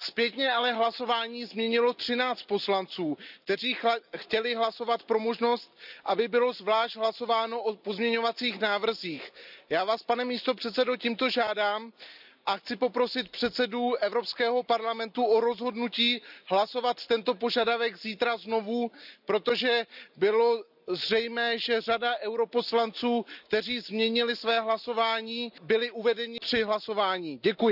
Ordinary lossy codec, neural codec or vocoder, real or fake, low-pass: none; none; real; 5.4 kHz